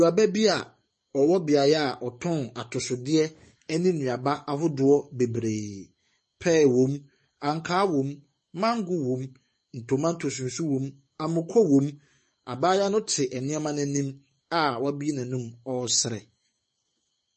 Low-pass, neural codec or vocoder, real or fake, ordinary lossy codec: 10.8 kHz; codec, 44.1 kHz, 7.8 kbps, DAC; fake; MP3, 32 kbps